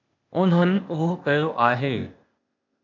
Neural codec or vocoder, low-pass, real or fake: codec, 16 kHz, 0.8 kbps, ZipCodec; 7.2 kHz; fake